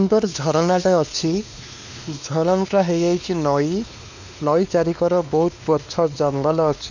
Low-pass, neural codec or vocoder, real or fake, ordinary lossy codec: 7.2 kHz; codec, 16 kHz, 2 kbps, X-Codec, WavLM features, trained on Multilingual LibriSpeech; fake; none